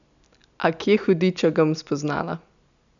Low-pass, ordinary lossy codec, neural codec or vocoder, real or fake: 7.2 kHz; none; none; real